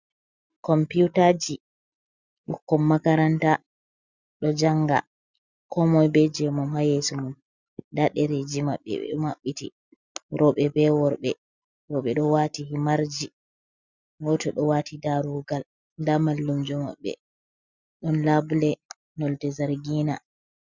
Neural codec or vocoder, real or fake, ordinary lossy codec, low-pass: none; real; Opus, 64 kbps; 7.2 kHz